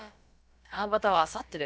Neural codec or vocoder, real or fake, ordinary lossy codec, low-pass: codec, 16 kHz, about 1 kbps, DyCAST, with the encoder's durations; fake; none; none